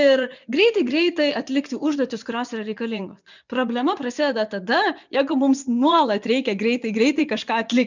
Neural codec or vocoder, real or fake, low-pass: vocoder, 24 kHz, 100 mel bands, Vocos; fake; 7.2 kHz